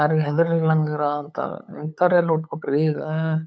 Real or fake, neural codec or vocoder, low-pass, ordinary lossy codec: fake; codec, 16 kHz, 8 kbps, FunCodec, trained on LibriTTS, 25 frames a second; none; none